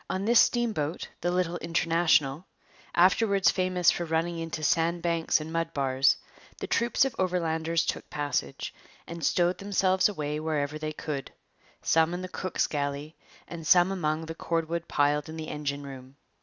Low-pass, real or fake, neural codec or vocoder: 7.2 kHz; real; none